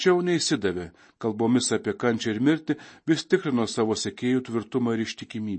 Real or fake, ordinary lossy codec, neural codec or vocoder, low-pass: real; MP3, 32 kbps; none; 9.9 kHz